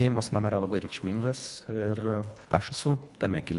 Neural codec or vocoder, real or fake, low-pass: codec, 24 kHz, 1.5 kbps, HILCodec; fake; 10.8 kHz